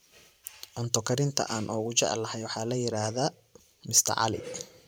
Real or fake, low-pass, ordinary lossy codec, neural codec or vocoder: fake; none; none; vocoder, 44.1 kHz, 128 mel bands every 512 samples, BigVGAN v2